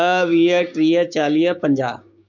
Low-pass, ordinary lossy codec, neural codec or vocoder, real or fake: 7.2 kHz; none; codec, 16 kHz, 6 kbps, DAC; fake